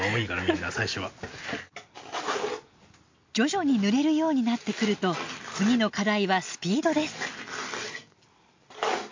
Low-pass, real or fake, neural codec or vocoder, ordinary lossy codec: 7.2 kHz; real; none; MP3, 48 kbps